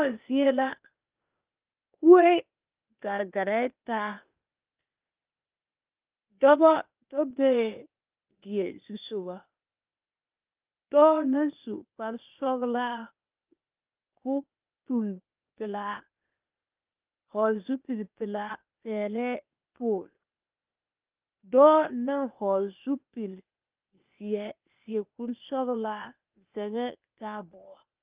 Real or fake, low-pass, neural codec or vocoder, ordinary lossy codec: fake; 3.6 kHz; codec, 16 kHz, 0.8 kbps, ZipCodec; Opus, 24 kbps